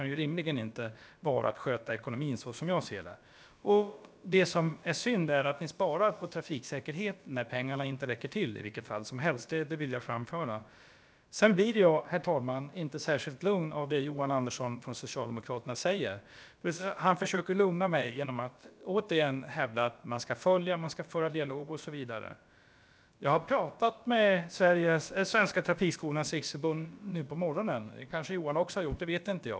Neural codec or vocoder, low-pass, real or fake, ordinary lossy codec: codec, 16 kHz, about 1 kbps, DyCAST, with the encoder's durations; none; fake; none